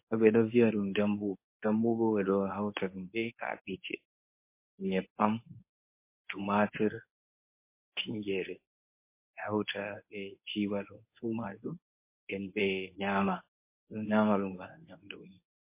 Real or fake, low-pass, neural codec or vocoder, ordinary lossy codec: fake; 3.6 kHz; codec, 24 kHz, 0.9 kbps, WavTokenizer, medium speech release version 1; MP3, 24 kbps